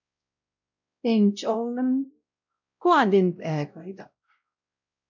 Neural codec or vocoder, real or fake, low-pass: codec, 16 kHz, 0.5 kbps, X-Codec, WavLM features, trained on Multilingual LibriSpeech; fake; 7.2 kHz